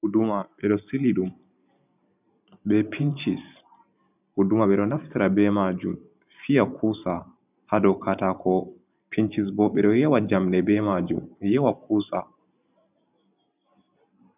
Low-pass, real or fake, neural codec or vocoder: 3.6 kHz; fake; vocoder, 44.1 kHz, 128 mel bands every 512 samples, BigVGAN v2